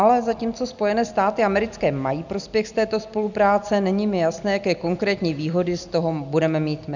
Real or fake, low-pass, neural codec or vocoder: real; 7.2 kHz; none